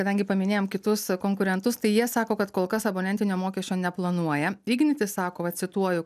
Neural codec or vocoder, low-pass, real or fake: none; 14.4 kHz; real